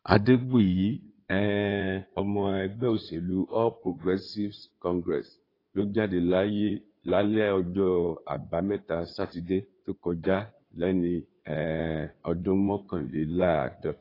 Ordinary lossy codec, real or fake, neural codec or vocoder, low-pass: AAC, 24 kbps; fake; codec, 16 kHz in and 24 kHz out, 2.2 kbps, FireRedTTS-2 codec; 5.4 kHz